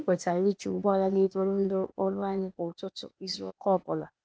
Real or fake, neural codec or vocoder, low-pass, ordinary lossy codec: fake; codec, 16 kHz, 0.8 kbps, ZipCodec; none; none